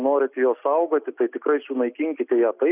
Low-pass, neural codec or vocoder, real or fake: 3.6 kHz; none; real